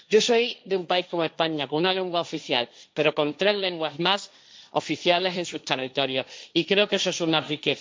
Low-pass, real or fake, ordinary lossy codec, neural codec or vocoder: none; fake; none; codec, 16 kHz, 1.1 kbps, Voila-Tokenizer